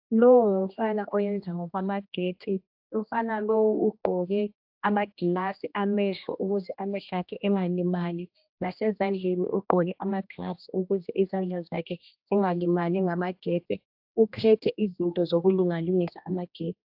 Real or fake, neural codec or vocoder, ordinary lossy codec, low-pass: fake; codec, 16 kHz, 1 kbps, X-Codec, HuBERT features, trained on general audio; AAC, 48 kbps; 5.4 kHz